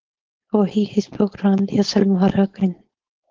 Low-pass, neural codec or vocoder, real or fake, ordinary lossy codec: 7.2 kHz; codec, 16 kHz, 4.8 kbps, FACodec; fake; Opus, 16 kbps